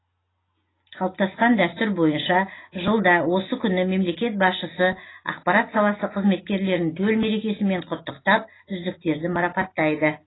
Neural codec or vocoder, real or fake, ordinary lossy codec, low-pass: none; real; AAC, 16 kbps; 7.2 kHz